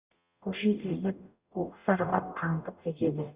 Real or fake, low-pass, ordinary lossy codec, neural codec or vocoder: fake; 3.6 kHz; Opus, 64 kbps; codec, 44.1 kHz, 0.9 kbps, DAC